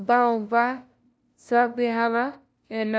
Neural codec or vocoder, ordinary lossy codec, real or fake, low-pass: codec, 16 kHz, 0.5 kbps, FunCodec, trained on LibriTTS, 25 frames a second; none; fake; none